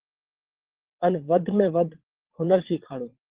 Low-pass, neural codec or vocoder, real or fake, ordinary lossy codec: 3.6 kHz; vocoder, 22.05 kHz, 80 mel bands, WaveNeXt; fake; Opus, 16 kbps